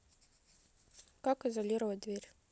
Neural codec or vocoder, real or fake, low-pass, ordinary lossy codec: none; real; none; none